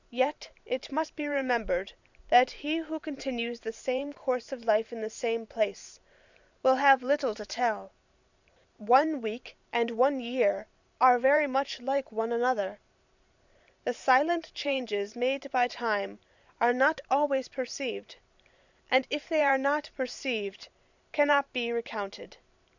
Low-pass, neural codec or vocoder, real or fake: 7.2 kHz; vocoder, 44.1 kHz, 128 mel bands every 512 samples, BigVGAN v2; fake